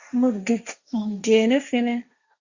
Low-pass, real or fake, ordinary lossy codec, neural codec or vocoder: 7.2 kHz; fake; Opus, 64 kbps; codec, 16 kHz, 1.1 kbps, Voila-Tokenizer